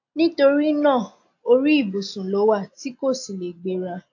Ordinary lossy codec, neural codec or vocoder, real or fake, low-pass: AAC, 48 kbps; none; real; 7.2 kHz